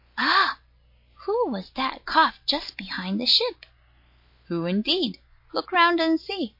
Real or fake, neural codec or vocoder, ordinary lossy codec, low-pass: real; none; MP3, 32 kbps; 5.4 kHz